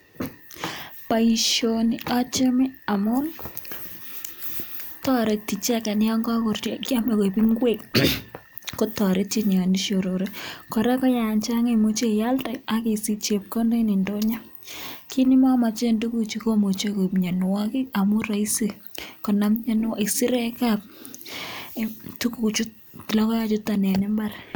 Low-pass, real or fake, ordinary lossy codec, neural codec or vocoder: none; real; none; none